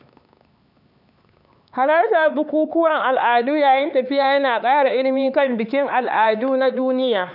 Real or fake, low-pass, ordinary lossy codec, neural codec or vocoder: fake; 5.4 kHz; none; codec, 16 kHz, 4 kbps, X-Codec, HuBERT features, trained on LibriSpeech